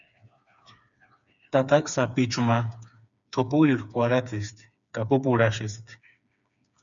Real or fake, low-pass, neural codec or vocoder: fake; 7.2 kHz; codec, 16 kHz, 4 kbps, FreqCodec, smaller model